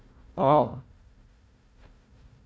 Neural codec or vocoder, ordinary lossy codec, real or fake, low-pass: codec, 16 kHz, 1 kbps, FunCodec, trained on Chinese and English, 50 frames a second; none; fake; none